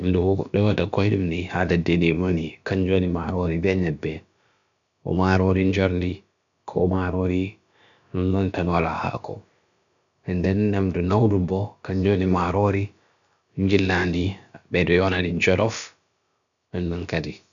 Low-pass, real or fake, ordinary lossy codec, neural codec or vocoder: 7.2 kHz; fake; none; codec, 16 kHz, about 1 kbps, DyCAST, with the encoder's durations